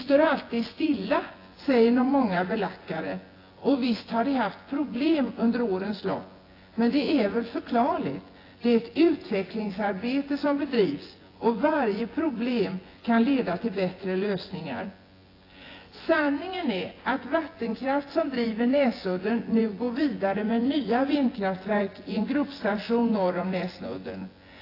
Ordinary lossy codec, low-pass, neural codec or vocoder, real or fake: AAC, 24 kbps; 5.4 kHz; vocoder, 24 kHz, 100 mel bands, Vocos; fake